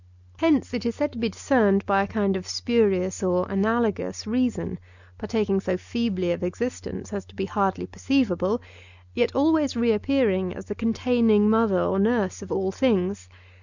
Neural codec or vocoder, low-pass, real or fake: none; 7.2 kHz; real